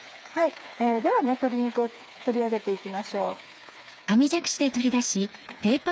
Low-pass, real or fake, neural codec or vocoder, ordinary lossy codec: none; fake; codec, 16 kHz, 4 kbps, FreqCodec, smaller model; none